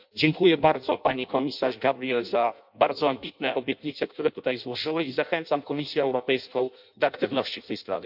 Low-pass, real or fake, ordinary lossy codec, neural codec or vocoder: 5.4 kHz; fake; MP3, 48 kbps; codec, 16 kHz in and 24 kHz out, 0.6 kbps, FireRedTTS-2 codec